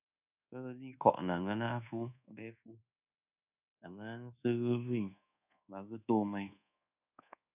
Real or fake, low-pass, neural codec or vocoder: fake; 3.6 kHz; codec, 24 kHz, 1.2 kbps, DualCodec